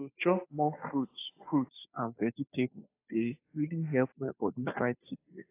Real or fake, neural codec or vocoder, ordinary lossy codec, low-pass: fake; codec, 16 kHz, 2 kbps, FunCodec, trained on Chinese and English, 25 frames a second; AAC, 24 kbps; 3.6 kHz